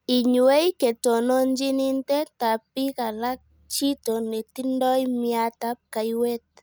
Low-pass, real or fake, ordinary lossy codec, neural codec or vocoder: none; real; none; none